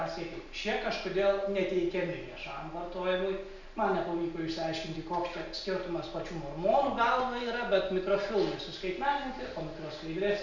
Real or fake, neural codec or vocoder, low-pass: real; none; 7.2 kHz